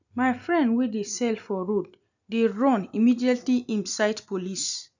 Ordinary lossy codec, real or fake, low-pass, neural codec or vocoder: none; real; 7.2 kHz; none